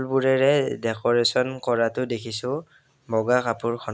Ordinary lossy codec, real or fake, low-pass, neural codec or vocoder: none; real; none; none